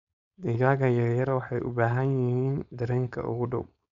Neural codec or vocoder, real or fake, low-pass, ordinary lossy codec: codec, 16 kHz, 4.8 kbps, FACodec; fake; 7.2 kHz; none